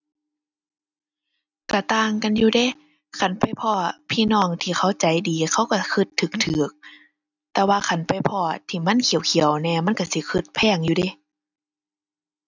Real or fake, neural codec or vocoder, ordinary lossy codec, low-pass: real; none; none; 7.2 kHz